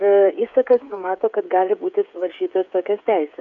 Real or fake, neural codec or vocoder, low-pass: fake; codec, 16 kHz, 16 kbps, FreqCodec, smaller model; 7.2 kHz